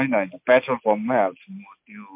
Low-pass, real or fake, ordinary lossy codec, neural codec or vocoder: 3.6 kHz; real; MP3, 32 kbps; none